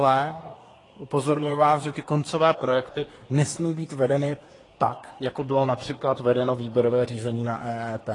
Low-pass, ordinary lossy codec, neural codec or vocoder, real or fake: 10.8 kHz; AAC, 32 kbps; codec, 24 kHz, 1 kbps, SNAC; fake